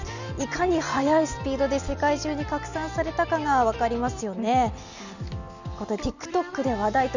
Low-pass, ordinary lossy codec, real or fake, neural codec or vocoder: 7.2 kHz; none; real; none